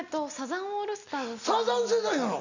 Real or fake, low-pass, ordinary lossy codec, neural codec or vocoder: real; 7.2 kHz; none; none